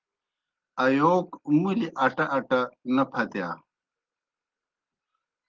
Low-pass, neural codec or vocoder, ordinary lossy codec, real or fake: 7.2 kHz; none; Opus, 16 kbps; real